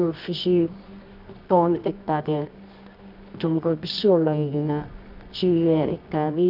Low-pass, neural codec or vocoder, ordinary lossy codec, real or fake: 5.4 kHz; codec, 24 kHz, 0.9 kbps, WavTokenizer, medium music audio release; none; fake